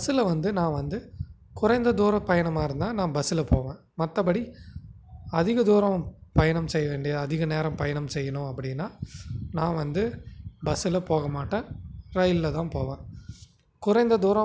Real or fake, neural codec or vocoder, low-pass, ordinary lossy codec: real; none; none; none